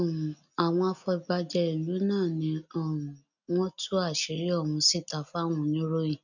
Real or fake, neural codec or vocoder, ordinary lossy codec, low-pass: real; none; none; 7.2 kHz